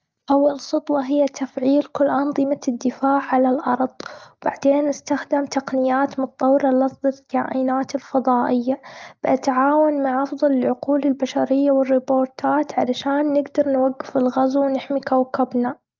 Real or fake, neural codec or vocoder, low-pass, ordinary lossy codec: real; none; 7.2 kHz; Opus, 24 kbps